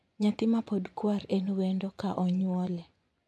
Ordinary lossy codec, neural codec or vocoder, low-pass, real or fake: none; vocoder, 24 kHz, 100 mel bands, Vocos; none; fake